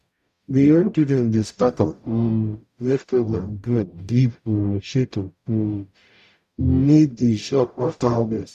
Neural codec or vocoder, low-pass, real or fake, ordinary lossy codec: codec, 44.1 kHz, 0.9 kbps, DAC; 14.4 kHz; fake; none